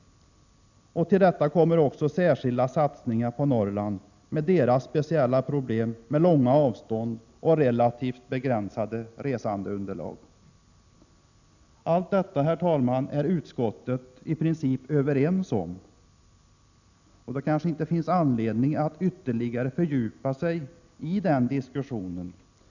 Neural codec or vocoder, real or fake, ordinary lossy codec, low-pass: none; real; none; 7.2 kHz